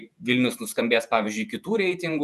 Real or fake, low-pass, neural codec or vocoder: fake; 14.4 kHz; vocoder, 48 kHz, 128 mel bands, Vocos